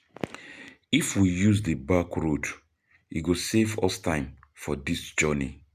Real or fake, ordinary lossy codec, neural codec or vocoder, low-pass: real; none; none; 14.4 kHz